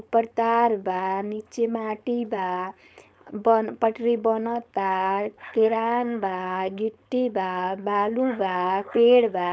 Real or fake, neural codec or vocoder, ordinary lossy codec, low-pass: fake; codec, 16 kHz, 4.8 kbps, FACodec; none; none